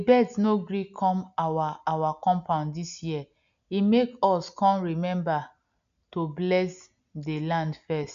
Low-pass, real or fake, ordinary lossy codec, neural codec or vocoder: 7.2 kHz; real; none; none